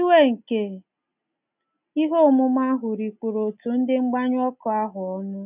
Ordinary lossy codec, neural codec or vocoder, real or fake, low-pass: none; none; real; 3.6 kHz